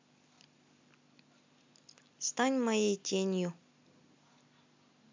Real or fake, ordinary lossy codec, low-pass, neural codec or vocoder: real; MP3, 64 kbps; 7.2 kHz; none